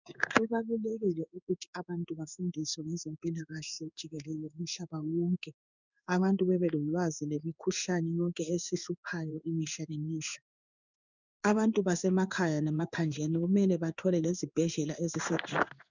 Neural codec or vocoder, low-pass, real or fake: codec, 16 kHz in and 24 kHz out, 1 kbps, XY-Tokenizer; 7.2 kHz; fake